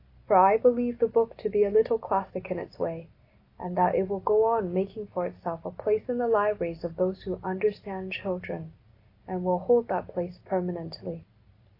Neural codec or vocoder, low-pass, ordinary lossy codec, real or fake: none; 5.4 kHz; AAC, 32 kbps; real